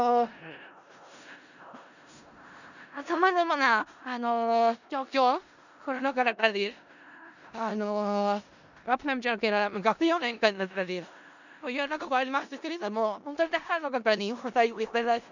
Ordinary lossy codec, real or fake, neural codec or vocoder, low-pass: none; fake; codec, 16 kHz in and 24 kHz out, 0.4 kbps, LongCat-Audio-Codec, four codebook decoder; 7.2 kHz